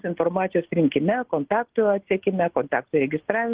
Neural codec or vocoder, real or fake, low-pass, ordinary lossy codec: none; real; 3.6 kHz; Opus, 16 kbps